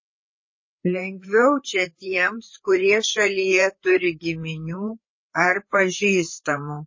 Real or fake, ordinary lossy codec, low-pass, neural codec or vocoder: fake; MP3, 32 kbps; 7.2 kHz; codec, 16 kHz, 4 kbps, FreqCodec, larger model